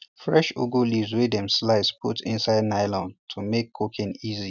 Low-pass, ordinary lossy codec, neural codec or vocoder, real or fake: 7.2 kHz; none; none; real